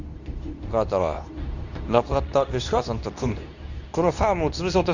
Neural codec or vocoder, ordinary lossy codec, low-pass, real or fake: codec, 24 kHz, 0.9 kbps, WavTokenizer, medium speech release version 2; none; 7.2 kHz; fake